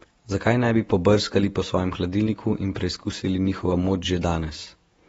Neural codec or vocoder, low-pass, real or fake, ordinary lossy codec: vocoder, 48 kHz, 128 mel bands, Vocos; 19.8 kHz; fake; AAC, 24 kbps